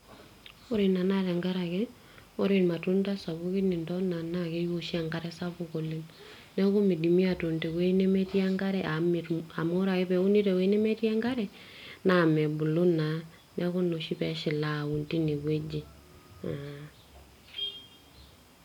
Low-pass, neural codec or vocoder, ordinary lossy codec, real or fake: 19.8 kHz; none; none; real